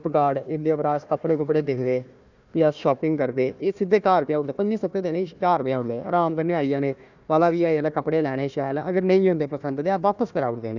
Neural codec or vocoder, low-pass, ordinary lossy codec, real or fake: codec, 16 kHz, 1 kbps, FunCodec, trained on Chinese and English, 50 frames a second; 7.2 kHz; none; fake